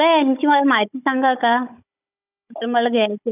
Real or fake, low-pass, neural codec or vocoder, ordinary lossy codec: fake; 3.6 kHz; codec, 16 kHz, 16 kbps, FunCodec, trained on Chinese and English, 50 frames a second; none